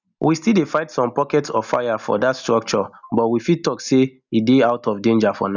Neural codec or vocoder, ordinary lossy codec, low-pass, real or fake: none; none; 7.2 kHz; real